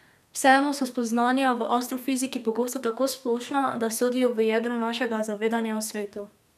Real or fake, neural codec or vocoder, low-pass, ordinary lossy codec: fake; codec, 32 kHz, 1.9 kbps, SNAC; 14.4 kHz; none